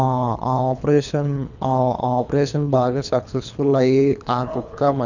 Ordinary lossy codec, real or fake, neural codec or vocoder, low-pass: none; fake; codec, 24 kHz, 3 kbps, HILCodec; 7.2 kHz